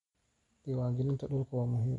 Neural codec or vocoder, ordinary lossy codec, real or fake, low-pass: none; MP3, 48 kbps; real; 14.4 kHz